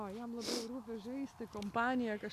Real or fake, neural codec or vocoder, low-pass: real; none; 14.4 kHz